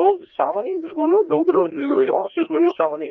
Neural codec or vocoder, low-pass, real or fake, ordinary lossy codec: codec, 16 kHz, 1 kbps, FreqCodec, larger model; 7.2 kHz; fake; Opus, 24 kbps